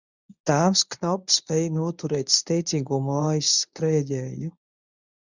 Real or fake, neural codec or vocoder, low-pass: fake; codec, 24 kHz, 0.9 kbps, WavTokenizer, medium speech release version 1; 7.2 kHz